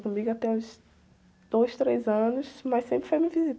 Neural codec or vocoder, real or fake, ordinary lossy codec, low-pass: none; real; none; none